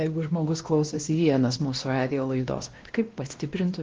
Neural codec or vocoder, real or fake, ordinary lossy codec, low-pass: codec, 16 kHz, 1 kbps, X-Codec, WavLM features, trained on Multilingual LibriSpeech; fake; Opus, 16 kbps; 7.2 kHz